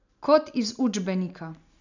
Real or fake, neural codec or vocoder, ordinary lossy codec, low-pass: real; none; none; 7.2 kHz